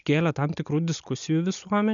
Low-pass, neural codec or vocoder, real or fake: 7.2 kHz; none; real